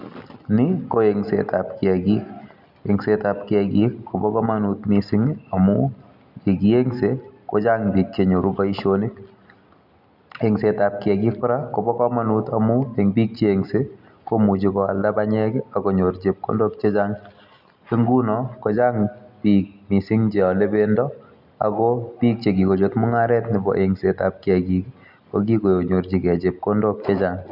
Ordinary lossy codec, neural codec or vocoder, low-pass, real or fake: none; none; 5.4 kHz; real